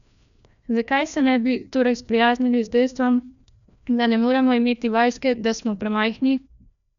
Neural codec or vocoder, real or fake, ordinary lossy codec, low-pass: codec, 16 kHz, 1 kbps, FreqCodec, larger model; fake; none; 7.2 kHz